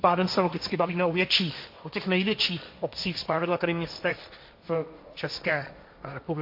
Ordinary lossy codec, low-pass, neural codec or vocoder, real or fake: MP3, 32 kbps; 5.4 kHz; codec, 16 kHz, 1.1 kbps, Voila-Tokenizer; fake